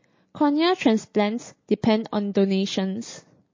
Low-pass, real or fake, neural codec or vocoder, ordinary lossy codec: 7.2 kHz; real; none; MP3, 32 kbps